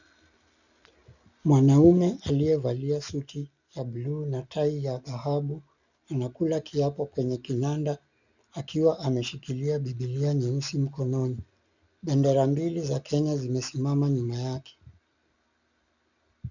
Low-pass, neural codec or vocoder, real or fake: 7.2 kHz; none; real